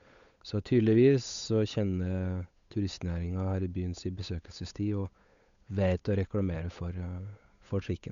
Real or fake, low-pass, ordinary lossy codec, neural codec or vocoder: fake; 7.2 kHz; none; codec, 16 kHz, 8 kbps, FunCodec, trained on Chinese and English, 25 frames a second